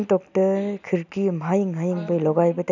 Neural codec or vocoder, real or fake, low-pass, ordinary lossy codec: none; real; 7.2 kHz; none